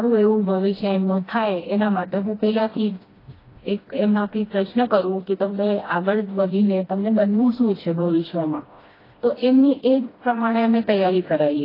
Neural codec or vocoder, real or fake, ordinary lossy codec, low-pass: codec, 16 kHz, 1 kbps, FreqCodec, smaller model; fake; AAC, 24 kbps; 5.4 kHz